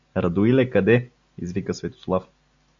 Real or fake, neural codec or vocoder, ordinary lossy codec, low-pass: real; none; AAC, 64 kbps; 7.2 kHz